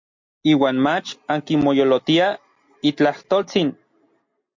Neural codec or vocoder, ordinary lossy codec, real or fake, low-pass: none; MP3, 48 kbps; real; 7.2 kHz